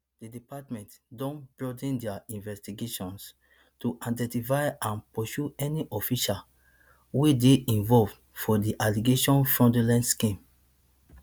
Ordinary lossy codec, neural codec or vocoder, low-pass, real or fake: none; none; none; real